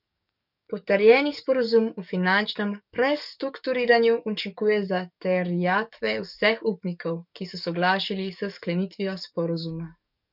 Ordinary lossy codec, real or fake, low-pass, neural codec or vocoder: none; fake; 5.4 kHz; codec, 44.1 kHz, 7.8 kbps, DAC